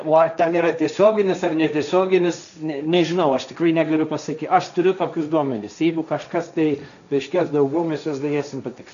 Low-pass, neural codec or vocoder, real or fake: 7.2 kHz; codec, 16 kHz, 1.1 kbps, Voila-Tokenizer; fake